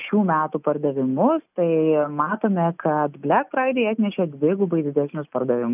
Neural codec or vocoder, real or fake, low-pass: none; real; 3.6 kHz